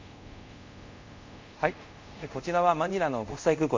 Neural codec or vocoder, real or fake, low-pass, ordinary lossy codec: codec, 24 kHz, 0.5 kbps, DualCodec; fake; 7.2 kHz; MP3, 48 kbps